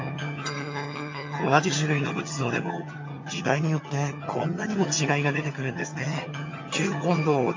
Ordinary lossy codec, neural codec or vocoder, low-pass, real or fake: MP3, 48 kbps; vocoder, 22.05 kHz, 80 mel bands, HiFi-GAN; 7.2 kHz; fake